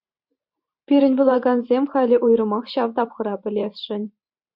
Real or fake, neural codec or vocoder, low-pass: fake; vocoder, 44.1 kHz, 128 mel bands every 512 samples, BigVGAN v2; 5.4 kHz